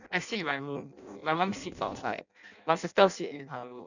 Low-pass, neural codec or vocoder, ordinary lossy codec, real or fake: 7.2 kHz; codec, 16 kHz in and 24 kHz out, 0.6 kbps, FireRedTTS-2 codec; none; fake